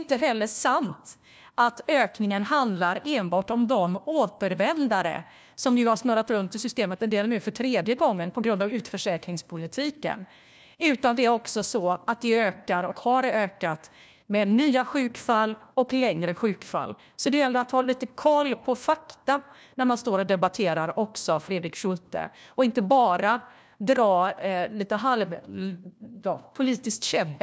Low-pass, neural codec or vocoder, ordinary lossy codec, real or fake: none; codec, 16 kHz, 1 kbps, FunCodec, trained on LibriTTS, 50 frames a second; none; fake